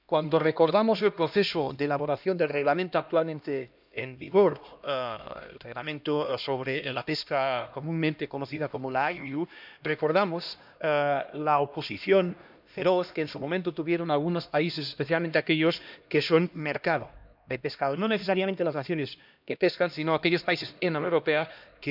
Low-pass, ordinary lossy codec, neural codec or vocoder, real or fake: 5.4 kHz; none; codec, 16 kHz, 1 kbps, X-Codec, HuBERT features, trained on LibriSpeech; fake